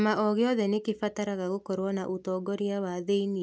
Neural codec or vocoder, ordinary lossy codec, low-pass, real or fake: none; none; none; real